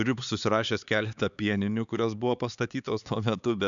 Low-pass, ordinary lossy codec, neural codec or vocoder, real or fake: 7.2 kHz; MP3, 96 kbps; codec, 16 kHz, 4 kbps, X-Codec, HuBERT features, trained on LibriSpeech; fake